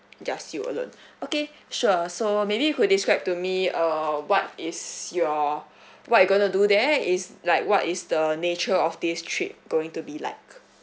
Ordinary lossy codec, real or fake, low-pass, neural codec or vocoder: none; real; none; none